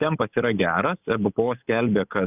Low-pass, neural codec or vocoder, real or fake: 3.6 kHz; none; real